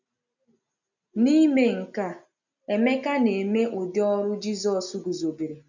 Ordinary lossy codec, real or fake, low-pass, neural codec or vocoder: none; real; 7.2 kHz; none